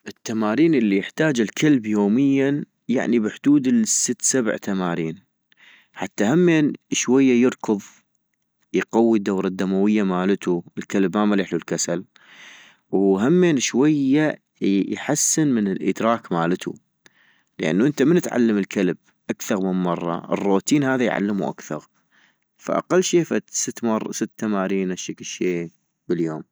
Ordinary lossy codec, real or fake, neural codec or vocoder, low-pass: none; real; none; none